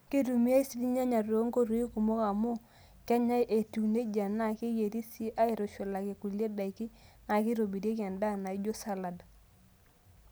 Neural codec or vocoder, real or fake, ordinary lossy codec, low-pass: none; real; none; none